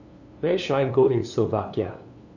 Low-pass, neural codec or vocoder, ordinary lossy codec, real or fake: 7.2 kHz; codec, 16 kHz, 2 kbps, FunCodec, trained on LibriTTS, 25 frames a second; none; fake